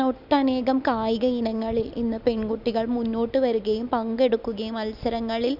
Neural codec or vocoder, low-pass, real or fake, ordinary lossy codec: none; 5.4 kHz; real; none